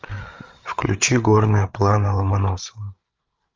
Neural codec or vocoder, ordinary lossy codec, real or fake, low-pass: codec, 16 kHz, 8 kbps, FreqCodec, larger model; Opus, 32 kbps; fake; 7.2 kHz